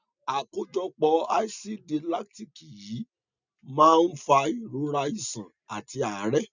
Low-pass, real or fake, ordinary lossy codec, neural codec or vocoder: 7.2 kHz; real; none; none